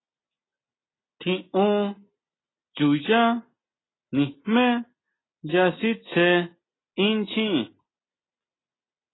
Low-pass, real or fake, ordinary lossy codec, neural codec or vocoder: 7.2 kHz; real; AAC, 16 kbps; none